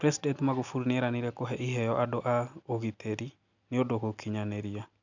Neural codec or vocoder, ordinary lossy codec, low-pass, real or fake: none; none; 7.2 kHz; real